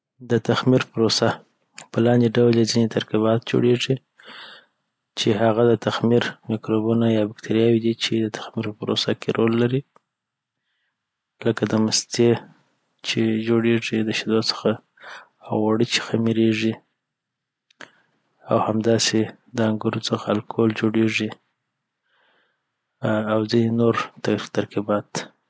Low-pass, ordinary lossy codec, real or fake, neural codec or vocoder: none; none; real; none